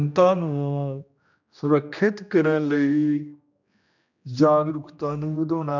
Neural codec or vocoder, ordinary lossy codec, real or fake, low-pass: codec, 16 kHz, 1 kbps, X-Codec, HuBERT features, trained on general audio; none; fake; 7.2 kHz